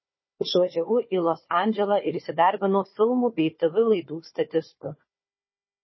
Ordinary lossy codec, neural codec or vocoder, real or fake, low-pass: MP3, 24 kbps; codec, 16 kHz, 4 kbps, FunCodec, trained on Chinese and English, 50 frames a second; fake; 7.2 kHz